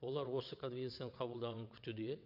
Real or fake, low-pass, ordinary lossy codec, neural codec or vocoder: fake; 5.4 kHz; MP3, 48 kbps; vocoder, 22.05 kHz, 80 mel bands, WaveNeXt